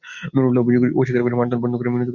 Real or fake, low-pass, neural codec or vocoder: real; 7.2 kHz; none